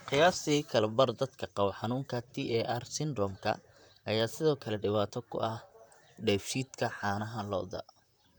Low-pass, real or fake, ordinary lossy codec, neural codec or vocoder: none; fake; none; vocoder, 44.1 kHz, 128 mel bands, Pupu-Vocoder